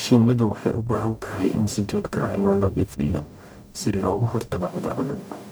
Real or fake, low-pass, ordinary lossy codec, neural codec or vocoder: fake; none; none; codec, 44.1 kHz, 0.9 kbps, DAC